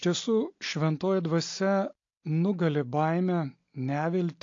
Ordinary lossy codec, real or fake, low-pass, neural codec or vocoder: AAC, 48 kbps; real; 7.2 kHz; none